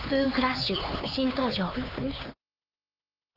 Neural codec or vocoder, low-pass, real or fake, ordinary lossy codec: codec, 16 kHz, 4 kbps, X-Codec, HuBERT features, trained on LibriSpeech; 5.4 kHz; fake; Opus, 32 kbps